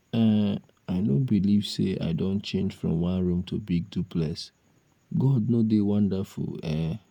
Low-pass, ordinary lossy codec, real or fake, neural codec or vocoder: 19.8 kHz; none; fake; vocoder, 48 kHz, 128 mel bands, Vocos